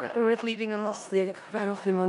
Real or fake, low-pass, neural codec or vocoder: fake; 10.8 kHz; codec, 16 kHz in and 24 kHz out, 0.4 kbps, LongCat-Audio-Codec, four codebook decoder